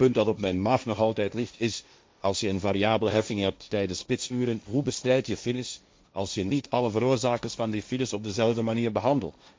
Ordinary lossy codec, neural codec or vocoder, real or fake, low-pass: none; codec, 16 kHz, 1.1 kbps, Voila-Tokenizer; fake; none